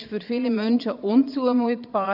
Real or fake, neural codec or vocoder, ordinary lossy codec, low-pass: fake; vocoder, 22.05 kHz, 80 mel bands, Vocos; none; 5.4 kHz